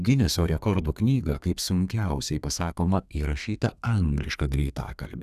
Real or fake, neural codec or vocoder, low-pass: fake; codec, 32 kHz, 1.9 kbps, SNAC; 14.4 kHz